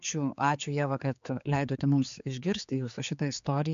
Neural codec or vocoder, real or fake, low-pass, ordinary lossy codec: codec, 16 kHz, 4 kbps, X-Codec, HuBERT features, trained on general audio; fake; 7.2 kHz; MP3, 64 kbps